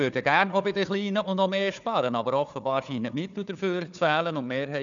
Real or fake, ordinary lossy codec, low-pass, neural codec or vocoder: fake; none; 7.2 kHz; codec, 16 kHz, 4 kbps, FunCodec, trained on Chinese and English, 50 frames a second